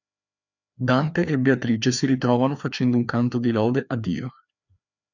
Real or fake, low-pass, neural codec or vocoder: fake; 7.2 kHz; codec, 16 kHz, 2 kbps, FreqCodec, larger model